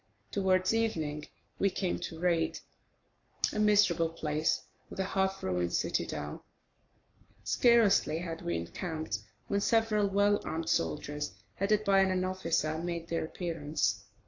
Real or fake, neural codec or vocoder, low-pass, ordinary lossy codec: fake; codec, 44.1 kHz, 7.8 kbps, Pupu-Codec; 7.2 kHz; AAC, 48 kbps